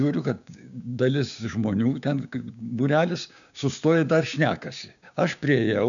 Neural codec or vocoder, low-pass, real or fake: codec, 16 kHz, 6 kbps, DAC; 7.2 kHz; fake